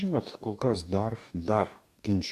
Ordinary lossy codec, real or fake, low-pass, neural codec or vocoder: AAC, 96 kbps; fake; 14.4 kHz; codec, 44.1 kHz, 2.6 kbps, DAC